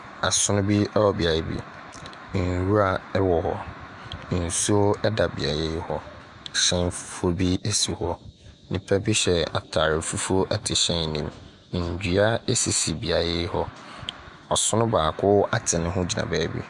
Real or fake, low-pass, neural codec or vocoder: fake; 10.8 kHz; autoencoder, 48 kHz, 128 numbers a frame, DAC-VAE, trained on Japanese speech